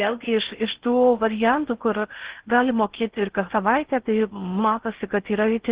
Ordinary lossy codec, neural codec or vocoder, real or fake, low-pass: Opus, 16 kbps; codec, 16 kHz in and 24 kHz out, 0.6 kbps, FocalCodec, streaming, 4096 codes; fake; 3.6 kHz